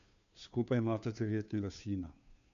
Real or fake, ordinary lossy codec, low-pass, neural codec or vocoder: fake; none; 7.2 kHz; codec, 16 kHz, 2 kbps, FunCodec, trained on Chinese and English, 25 frames a second